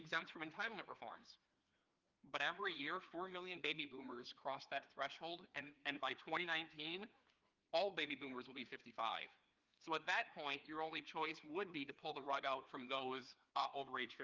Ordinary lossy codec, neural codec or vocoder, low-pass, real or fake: Opus, 32 kbps; codec, 16 kHz, 2 kbps, FreqCodec, larger model; 7.2 kHz; fake